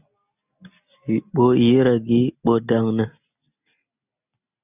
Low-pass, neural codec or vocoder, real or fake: 3.6 kHz; none; real